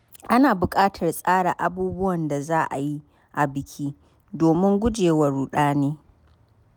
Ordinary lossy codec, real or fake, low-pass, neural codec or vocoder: none; real; none; none